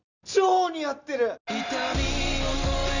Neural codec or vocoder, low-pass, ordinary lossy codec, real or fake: none; 7.2 kHz; none; real